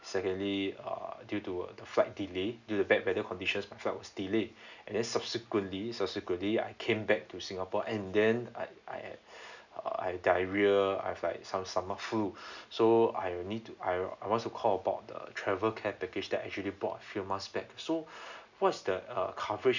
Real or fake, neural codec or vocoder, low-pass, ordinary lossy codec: real; none; 7.2 kHz; none